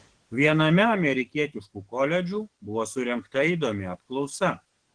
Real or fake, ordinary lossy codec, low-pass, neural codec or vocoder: fake; Opus, 16 kbps; 9.9 kHz; codec, 44.1 kHz, 7.8 kbps, DAC